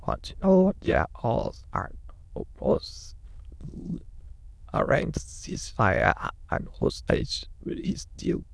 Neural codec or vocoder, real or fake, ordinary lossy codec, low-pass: autoencoder, 22.05 kHz, a latent of 192 numbers a frame, VITS, trained on many speakers; fake; none; none